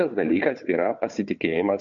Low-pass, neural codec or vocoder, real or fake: 7.2 kHz; codec, 16 kHz, 4 kbps, FunCodec, trained on Chinese and English, 50 frames a second; fake